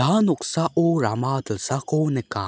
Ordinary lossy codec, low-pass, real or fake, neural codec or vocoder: none; none; real; none